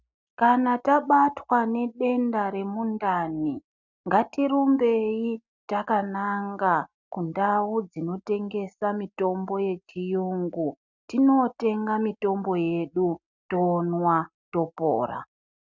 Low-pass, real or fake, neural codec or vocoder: 7.2 kHz; real; none